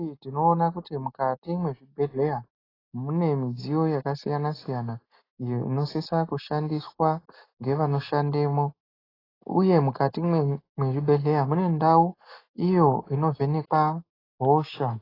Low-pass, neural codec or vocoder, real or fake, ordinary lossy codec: 5.4 kHz; none; real; AAC, 24 kbps